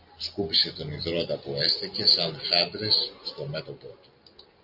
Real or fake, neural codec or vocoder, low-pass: real; none; 5.4 kHz